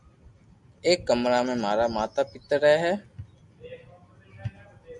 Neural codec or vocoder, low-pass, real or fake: none; 10.8 kHz; real